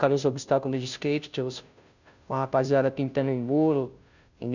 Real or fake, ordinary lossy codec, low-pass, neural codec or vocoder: fake; none; 7.2 kHz; codec, 16 kHz, 0.5 kbps, FunCodec, trained on Chinese and English, 25 frames a second